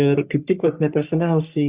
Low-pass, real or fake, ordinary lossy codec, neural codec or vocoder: 3.6 kHz; fake; Opus, 64 kbps; codec, 44.1 kHz, 3.4 kbps, Pupu-Codec